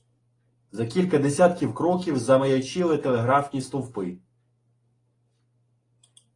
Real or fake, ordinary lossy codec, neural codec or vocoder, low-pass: real; AAC, 32 kbps; none; 10.8 kHz